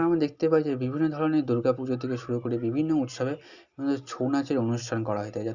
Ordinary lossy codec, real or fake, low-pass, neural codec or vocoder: Opus, 64 kbps; real; 7.2 kHz; none